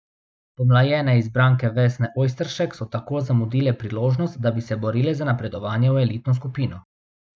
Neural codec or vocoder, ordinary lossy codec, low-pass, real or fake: none; none; none; real